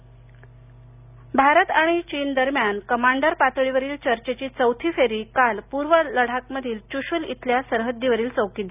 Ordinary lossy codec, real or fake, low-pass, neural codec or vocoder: none; real; 3.6 kHz; none